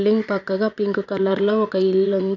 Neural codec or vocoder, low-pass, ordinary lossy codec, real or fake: vocoder, 22.05 kHz, 80 mel bands, Vocos; 7.2 kHz; none; fake